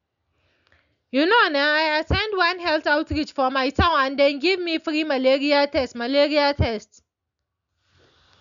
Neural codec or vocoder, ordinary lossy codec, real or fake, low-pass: none; none; real; 7.2 kHz